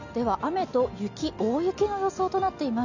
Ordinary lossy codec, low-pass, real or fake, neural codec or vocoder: none; 7.2 kHz; real; none